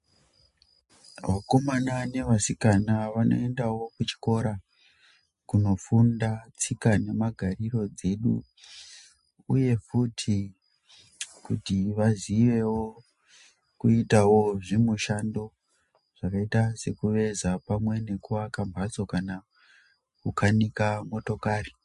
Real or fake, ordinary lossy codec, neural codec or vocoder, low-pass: real; MP3, 48 kbps; none; 10.8 kHz